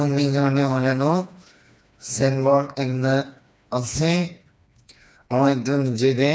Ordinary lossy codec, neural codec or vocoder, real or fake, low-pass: none; codec, 16 kHz, 2 kbps, FreqCodec, smaller model; fake; none